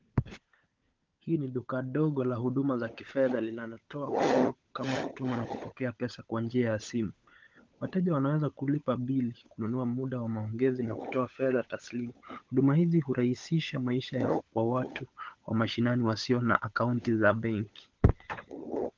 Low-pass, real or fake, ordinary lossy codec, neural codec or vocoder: 7.2 kHz; fake; Opus, 24 kbps; codec, 16 kHz, 16 kbps, FunCodec, trained on LibriTTS, 50 frames a second